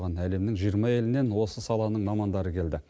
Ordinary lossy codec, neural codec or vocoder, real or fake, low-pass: none; none; real; none